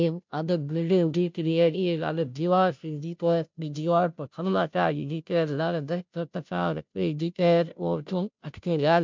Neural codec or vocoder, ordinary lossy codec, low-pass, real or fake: codec, 16 kHz, 0.5 kbps, FunCodec, trained on Chinese and English, 25 frames a second; MP3, 64 kbps; 7.2 kHz; fake